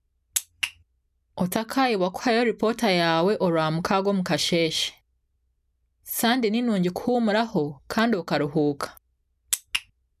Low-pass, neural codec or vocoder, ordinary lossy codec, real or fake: 14.4 kHz; none; none; real